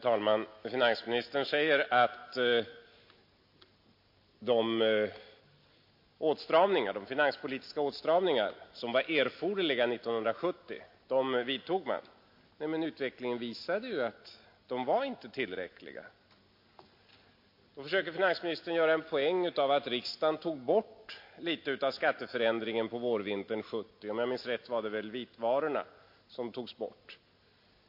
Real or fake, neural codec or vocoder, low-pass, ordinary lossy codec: real; none; 5.4 kHz; MP3, 32 kbps